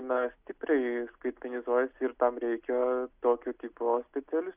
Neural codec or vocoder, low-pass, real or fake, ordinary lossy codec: none; 3.6 kHz; real; Opus, 64 kbps